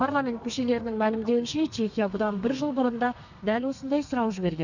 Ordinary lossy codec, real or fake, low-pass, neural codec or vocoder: none; fake; 7.2 kHz; codec, 32 kHz, 1.9 kbps, SNAC